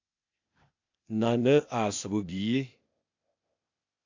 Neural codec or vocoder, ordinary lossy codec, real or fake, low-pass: codec, 16 kHz, 0.8 kbps, ZipCodec; AAC, 48 kbps; fake; 7.2 kHz